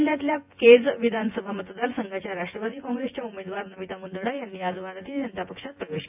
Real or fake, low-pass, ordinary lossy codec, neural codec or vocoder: fake; 3.6 kHz; AAC, 32 kbps; vocoder, 24 kHz, 100 mel bands, Vocos